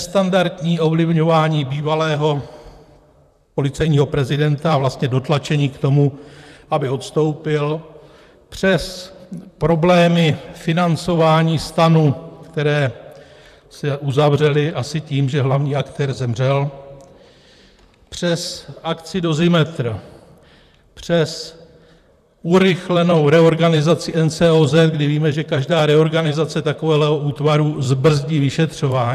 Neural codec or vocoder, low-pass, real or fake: vocoder, 44.1 kHz, 128 mel bands, Pupu-Vocoder; 14.4 kHz; fake